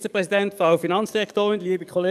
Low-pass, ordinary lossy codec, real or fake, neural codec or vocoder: 14.4 kHz; none; fake; codec, 44.1 kHz, 7.8 kbps, DAC